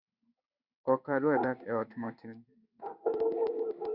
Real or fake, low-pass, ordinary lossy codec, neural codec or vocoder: fake; 5.4 kHz; Opus, 64 kbps; codec, 16 kHz in and 24 kHz out, 1 kbps, XY-Tokenizer